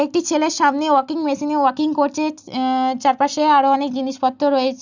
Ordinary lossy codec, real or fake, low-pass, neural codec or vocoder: none; real; 7.2 kHz; none